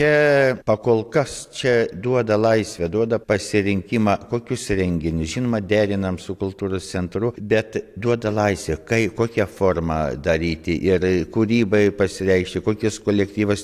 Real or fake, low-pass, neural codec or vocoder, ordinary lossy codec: real; 14.4 kHz; none; AAC, 64 kbps